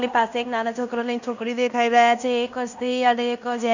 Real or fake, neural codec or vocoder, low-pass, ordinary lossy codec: fake; codec, 16 kHz in and 24 kHz out, 0.9 kbps, LongCat-Audio-Codec, fine tuned four codebook decoder; 7.2 kHz; none